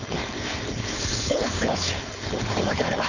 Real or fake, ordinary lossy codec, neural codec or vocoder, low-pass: fake; none; codec, 16 kHz, 4.8 kbps, FACodec; 7.2 kHz